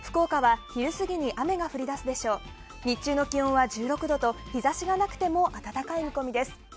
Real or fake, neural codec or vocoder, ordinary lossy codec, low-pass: real; none; none; none